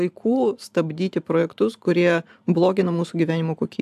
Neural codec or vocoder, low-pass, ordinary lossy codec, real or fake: vocoder, 44.1 kHz, 128 mel bands every 512 samples, BigVGAN v2; 14.4 kHz; AAC, 96 kbps; fake